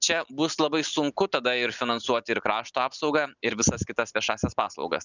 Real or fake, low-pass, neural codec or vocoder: real; 7.2 kHz; none